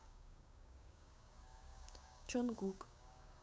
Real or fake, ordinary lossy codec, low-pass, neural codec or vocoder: fake; none; none; codec, 16 kHz, 6 kbps, DAC